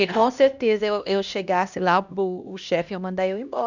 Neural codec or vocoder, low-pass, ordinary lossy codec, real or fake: codec, 16 kHz, 1 kbps, X-Codec, HuBERT features, trained on LibriSpeech; 7.2 kHz; none; fake